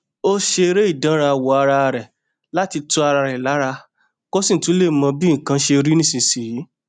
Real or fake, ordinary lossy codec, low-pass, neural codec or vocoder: real; none; 9.9 kHz; none